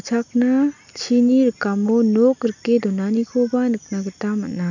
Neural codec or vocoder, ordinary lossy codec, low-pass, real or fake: none; none; 7.2 kHz; real